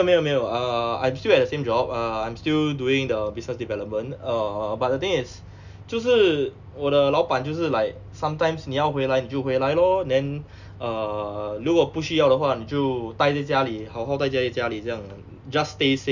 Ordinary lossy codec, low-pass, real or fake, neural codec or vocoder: none; 7.2 kHz; real; none